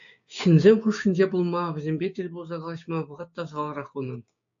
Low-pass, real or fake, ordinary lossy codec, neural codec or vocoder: 7.2 kHz; fake; AAC, 64 kbps; codec, 16 kHz, 6 kbps, DAC